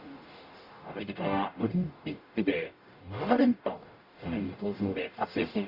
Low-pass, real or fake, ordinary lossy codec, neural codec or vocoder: 5.4 kHz; fake; Opus, 64 kbps; codec, 44.1 kHz, 0.9 kbps, DAC